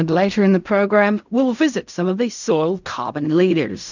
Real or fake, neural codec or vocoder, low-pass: fake; codec, 16 kHz in and 24 kHz out, 0.4 kbps, LongCat-Audio-Codec, fine tuned four codebook decoder; 7.2 kHz